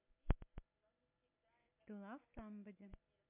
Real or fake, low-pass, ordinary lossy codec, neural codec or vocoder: real; 3.6 kHz; MP3, 32 kbps; none